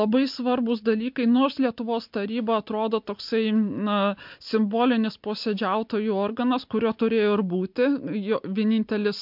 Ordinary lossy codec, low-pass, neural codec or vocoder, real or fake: MP3, 48 kbps; 5.4 kHz; none; real